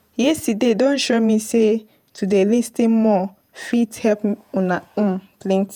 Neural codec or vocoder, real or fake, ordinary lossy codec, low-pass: vocoder, 48 kHz, 128 mel bands, Vocos; fake; none; 19.8 kHz